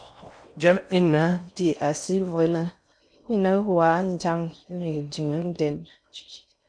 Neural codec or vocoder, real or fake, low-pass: codec, 16 kHz in and 24 kHz out, 0.6 kbps, FocalCodec, streaming, 4096 codes; fake; 9.9 kHz